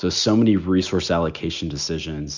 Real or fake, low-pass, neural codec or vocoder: real; 7.2 kHz; none